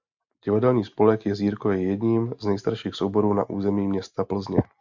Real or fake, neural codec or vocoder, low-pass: real; none; 7.2 kHz